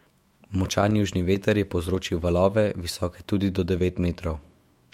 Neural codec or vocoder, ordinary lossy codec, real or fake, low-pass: autoencoder, 48 kHz, 128 numbers a frame, DAC-VAE, trained on Japanese speech; MP3, 64 kbps; fake; 19.8 kHz